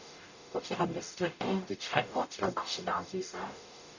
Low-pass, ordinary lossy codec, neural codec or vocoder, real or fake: 7.2 kHz; none; codec, 44.1 kHz, 0.9 kbps, DAC; fake